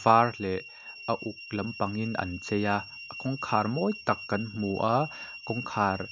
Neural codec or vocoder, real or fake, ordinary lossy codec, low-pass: none; real; MP3, 64 kbps; 7.2 kHz